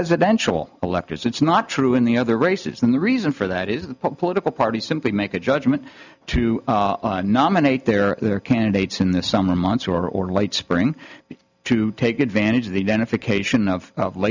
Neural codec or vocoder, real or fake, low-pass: none; real; 7.2 kHz